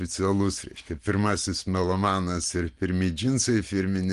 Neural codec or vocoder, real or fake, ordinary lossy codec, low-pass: none; real; Opus, 24 kbps; 10.8 kHz